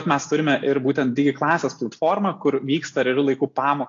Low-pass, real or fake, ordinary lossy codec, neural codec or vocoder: 7.2 kHz; real; AAC, 48 kbps; none